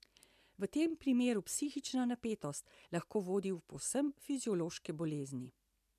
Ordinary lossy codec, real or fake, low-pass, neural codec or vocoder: MP3, 96 kbps; real; 14.4 kHz; none